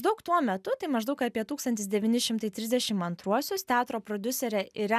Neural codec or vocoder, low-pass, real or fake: none; 14.4 kHz; real